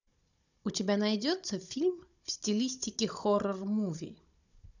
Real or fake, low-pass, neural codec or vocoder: fake; 7.2 kHz; codec, 16 kHz, 16 kbps, FunCodec, trained on Chinese and English, 50 frames a second